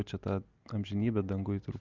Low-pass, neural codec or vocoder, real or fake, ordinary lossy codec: 7.2 kHz; none; real; Opus, 24 kbps